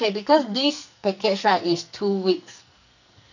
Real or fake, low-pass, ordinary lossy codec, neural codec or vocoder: fake; 7.2 kHz; none; codec, 44.1 kHz, 2.6 kbps, SNAC